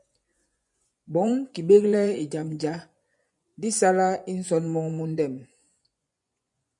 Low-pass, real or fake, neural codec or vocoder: 10.8 kHz; fake; vocoder, 24 kHz, 100 mel bands, Vocos